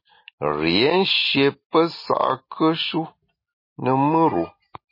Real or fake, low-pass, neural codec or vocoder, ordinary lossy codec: real; 5.4 kHz; none; MP3, 24 kbps